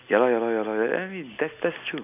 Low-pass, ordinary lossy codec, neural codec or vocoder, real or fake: 3.6 kHz; none; none; real